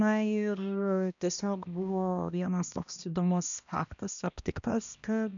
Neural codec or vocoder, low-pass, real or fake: codec, 16 kHz, 1 kbps, X-Codec, HuBERT features, trained on balanced general audio; 7.2 kHz; fake